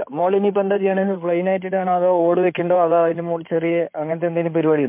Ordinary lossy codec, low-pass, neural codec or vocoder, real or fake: MP3, 32 kbps; 3.6 kHz; codec, 16 kHz in and 24 kHz out, 2.2 kbps, FireRedTTS-2 codec; fake